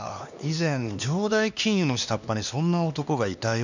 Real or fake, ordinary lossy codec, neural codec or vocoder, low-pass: fake; none; codec, 16 kHz, 2 kbps, X-Codec, HuBERT features, trained on LibriSpeech; 7.2 kHz